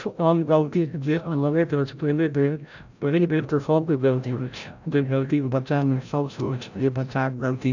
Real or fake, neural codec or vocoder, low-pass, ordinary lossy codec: fake; codec, 16 kHz, 0.5 kbps, FreqCodec, larger model; 7.2 kHz; none